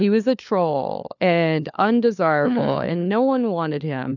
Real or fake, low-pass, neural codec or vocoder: fake; 7.2 kHz; codec, 16 kHz, 4 kbps, FunCodec, trained on LibriTTS, 50 frames a second